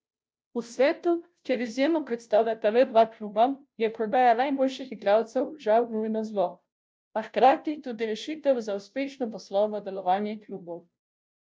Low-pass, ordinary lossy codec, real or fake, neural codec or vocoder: none; none; fake; codec, 16 kHz, 0.5 kbps, FunCodec, trained on Chinese and English, 25 frames a second